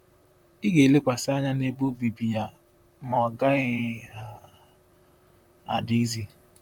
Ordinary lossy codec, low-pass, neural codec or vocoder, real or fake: none; 19.8 kHz; vocoder, 44.1 kHz, 128 mel bands, Pupu-Vocoder; fake